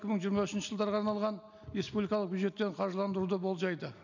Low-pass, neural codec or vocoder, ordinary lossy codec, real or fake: 7.2 kHz; none; none; real